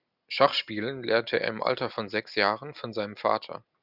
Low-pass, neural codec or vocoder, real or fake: 5.4 kHz; none; real